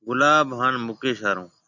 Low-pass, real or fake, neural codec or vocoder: 7.2 kHz; real; none